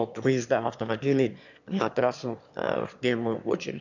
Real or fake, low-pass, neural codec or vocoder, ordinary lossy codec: fake; 7.2 kHz; autoencoder, 22.05 kHz, a latent of 192 numbers a frame, VITS, trained on one speaker; none